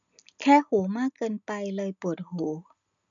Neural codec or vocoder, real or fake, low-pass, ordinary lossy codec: none; real; 7.2 kHz; none